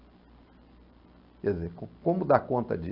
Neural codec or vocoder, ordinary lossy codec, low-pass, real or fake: none; Opus, 64 kbps; 5.4 kHz; real